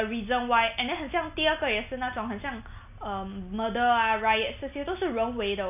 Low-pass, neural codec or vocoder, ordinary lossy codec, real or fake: 3.6 kHz; none; none; real